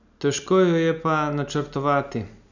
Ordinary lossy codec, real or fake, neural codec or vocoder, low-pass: none; real; none; 7.2 kHz